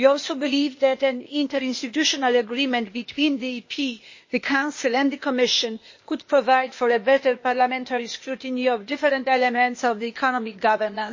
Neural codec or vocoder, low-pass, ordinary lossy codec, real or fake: codec, 16 kHz, 0.8 kbps, ZipCodec; 7.2 kHz; MP3, 32 kbps; fake